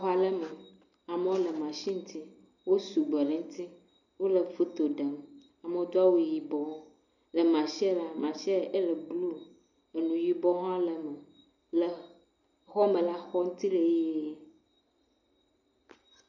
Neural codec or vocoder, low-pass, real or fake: none; 7.2 kHz; real